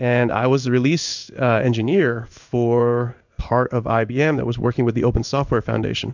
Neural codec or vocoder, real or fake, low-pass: codec, 16 kHz in and 24 kHz out, 1 kbps, XY-Tokenizer; fake; 7.2 kHz